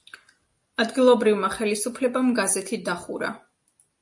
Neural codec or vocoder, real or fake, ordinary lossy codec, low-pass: none; real; MP3, 48 kbps; 10.8 kHz